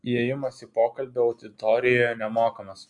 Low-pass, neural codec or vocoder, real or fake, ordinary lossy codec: 10.8 kHz; none; real; AAC, 64 kbps